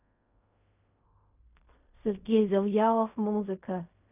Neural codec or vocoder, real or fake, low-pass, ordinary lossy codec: codec, 16 kHz in and 24 kHz out, 0.4 kbps, LongCat-Audio-Codec, fine tuned four codebook decoder; fake; 3.6 kHz; none